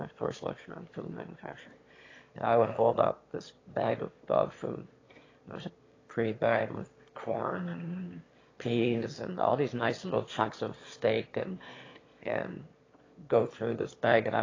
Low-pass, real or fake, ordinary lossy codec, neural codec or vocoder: 7.2 kHz; fake; AAC, 32 kbps; autoencoder, 22.05 kHz, a latent of 192 numbers a frame, VITS, trained on one speaker